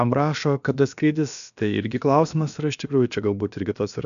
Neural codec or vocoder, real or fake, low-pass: codec, 16 kHz, about 1 kbps, DyCAST, with the encoder's durations; fake; 7.2 kHz